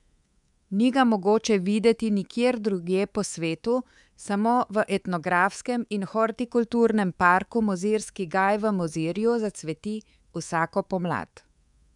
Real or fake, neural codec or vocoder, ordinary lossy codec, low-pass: fake; codec, 24 kHz, 3.1 kbps, DualCodec; none; 10.8 kHz